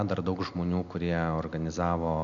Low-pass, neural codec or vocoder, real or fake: 7.2 kHz; none; real